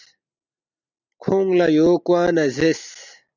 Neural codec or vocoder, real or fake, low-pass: none; real; 7.2 kHz